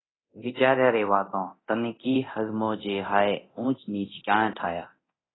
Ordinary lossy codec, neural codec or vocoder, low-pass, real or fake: AAC, 16 kbps; codec, 24 kHz, 0.9 kbps, DualCodec; 7.2 kHz; fake